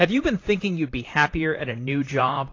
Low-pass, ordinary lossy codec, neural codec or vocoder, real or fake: 7.2 kHz; AAC, 32 kbps; vocoder, 44.1 kHz, 128 mel bands, Pupu-Vocoder; fake